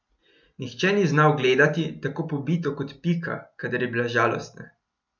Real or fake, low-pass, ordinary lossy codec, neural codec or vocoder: real; 7.2 kHz; none; none